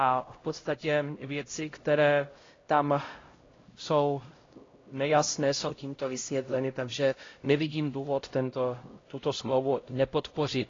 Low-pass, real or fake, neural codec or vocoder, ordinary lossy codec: 7.2 kHz; fake; codec, 16 kHz, 0.5 kbps, X-Codec, HuBERT features, trained on LibriSpeech; AAC, 32 kbps